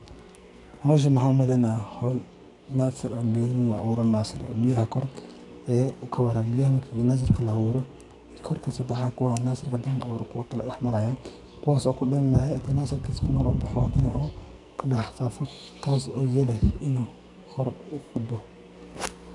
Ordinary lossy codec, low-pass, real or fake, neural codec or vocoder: none; 10.8 kHz; fake; codec, 44.1 kHz, 2.6 kbps, SNAC